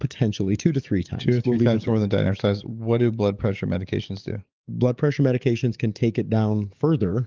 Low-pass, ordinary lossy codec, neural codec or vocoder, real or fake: 7.2 kHz; Opus, 32 kbps; codec, 44.1 kHz, 7.8 kbps, DAC; fake